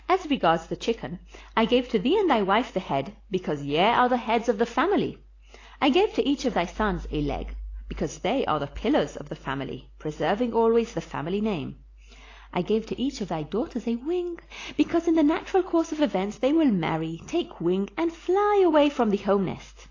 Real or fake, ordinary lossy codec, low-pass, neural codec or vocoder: real; AAC, 32 kbps; 7.2 kHz; none